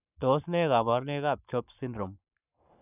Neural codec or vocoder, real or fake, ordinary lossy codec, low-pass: codec, 44.1 kHz, 7.8 kbps, Pupu-Codec; fake; none; 3.6 kHz